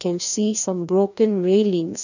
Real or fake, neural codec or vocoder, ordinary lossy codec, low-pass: fake; codec, 16 kHz, 1 kbps, FreqCodec, larger model; none; 7.2 kHz